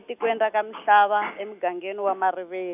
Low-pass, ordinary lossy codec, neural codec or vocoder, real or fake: 3.6 kHz; none; none; real